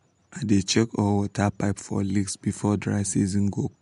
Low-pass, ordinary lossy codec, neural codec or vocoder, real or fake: 10.8 kHz; MP3, 64 kbps; none; real